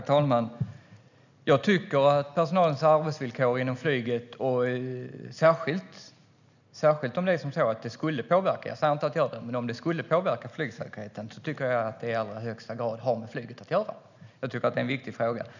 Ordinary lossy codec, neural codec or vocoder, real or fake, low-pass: none; none; real; 7.2 kHz